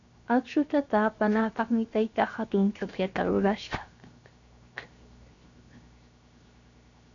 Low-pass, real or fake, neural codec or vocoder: 7.2 kHz; fake; codec, 16 kHz, 0.7 kbps, FocalCodec